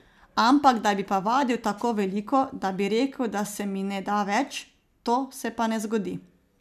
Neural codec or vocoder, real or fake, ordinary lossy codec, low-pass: none; real; none; 14.4 kHz